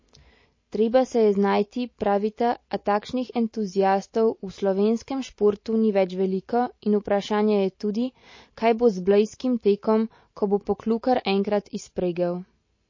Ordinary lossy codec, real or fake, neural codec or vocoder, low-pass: MP3, 32 kbps; real; none; 7.2 kHz